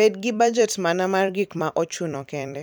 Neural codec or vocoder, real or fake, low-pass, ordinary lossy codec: vocoder, 44.1 kHz, 128 mel bands every 256 samples, BigVGAN v2; fake; none; none